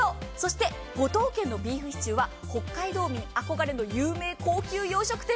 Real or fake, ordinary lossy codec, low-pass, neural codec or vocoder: real; none; none; none